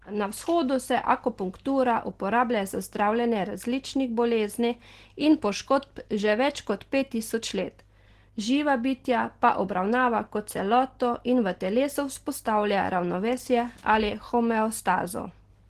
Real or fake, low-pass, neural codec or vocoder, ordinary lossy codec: real; 14.4 kHz; none; Opus, 16 kbps